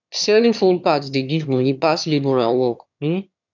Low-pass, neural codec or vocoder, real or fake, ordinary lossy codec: 7.2 kHz; autoencoder, 22.05 kHz, a latent of 192 numbers a frame, VITS, trained on one speaker; fake; none